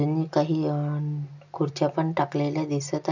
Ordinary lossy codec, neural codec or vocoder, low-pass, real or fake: none; none; 7.2 kHz; real